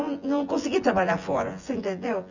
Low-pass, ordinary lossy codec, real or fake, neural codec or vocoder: 7.2 kHz; none; fake; vocoder, 24 kHz, 100 mel bands, Vocos